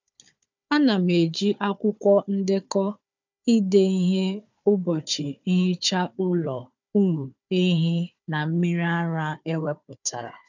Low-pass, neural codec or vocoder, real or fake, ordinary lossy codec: 7.2 kHz; codec, 16 kHz, 4 kbps, FunCodec, trained on Chinese and English, 50 frames a second; fake; none